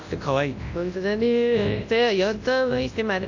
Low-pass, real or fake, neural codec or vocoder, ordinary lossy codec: 7.2 kHz; fake; codec, 24 kHz, 0.9 kbps, WavTokenizer, large speech release; AAC, 48 kbps